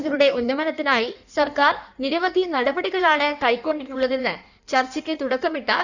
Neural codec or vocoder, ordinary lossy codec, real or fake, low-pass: codec, 16 kHz in and 24 kHz out, 1.1 kbps, FireRedTTS-2 codec; none; fake; 7.2 kHz